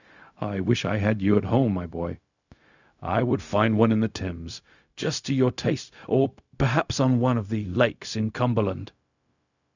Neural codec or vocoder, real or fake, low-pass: codec, 16 kHz, 0.4 kbps, LongCat-Audio-Codec; fake; 7.2 kHz